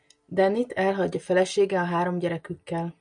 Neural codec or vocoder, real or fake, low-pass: none; real; 9.9 kHz